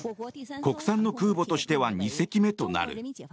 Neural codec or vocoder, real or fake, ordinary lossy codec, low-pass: none; real; none; none